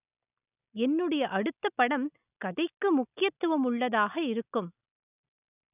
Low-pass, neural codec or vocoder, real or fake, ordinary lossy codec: 3.6 kHz; none; real; none